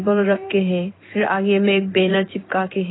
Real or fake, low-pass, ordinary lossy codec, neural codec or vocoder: fake; 7.2 kHz; AAC, 16 kbps; codec, 16 kHz in and 24 kHz out, 1 kbps, XY-Tokenizer